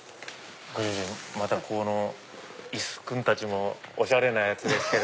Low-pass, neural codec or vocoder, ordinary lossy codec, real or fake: none; none; none; real